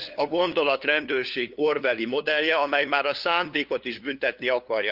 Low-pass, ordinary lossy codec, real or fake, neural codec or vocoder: 5.4 kHz; Opus, 24 kbps; fake; codec, 16 kHz, 2 kbps, FunCodec, trained on LibriTTS, 25 frames a second